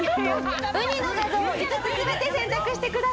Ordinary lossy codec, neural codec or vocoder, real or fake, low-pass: none; none; real; none